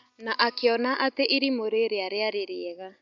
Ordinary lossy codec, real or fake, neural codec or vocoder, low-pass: MP3, 96 kbps; real; none; 7.2 kHz